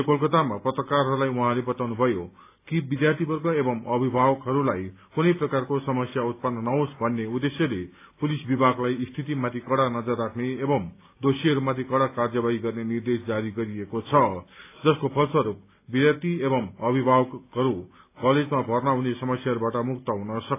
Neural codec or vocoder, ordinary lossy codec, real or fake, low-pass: none; AAC, 24 kbps; real; 3.6 kHz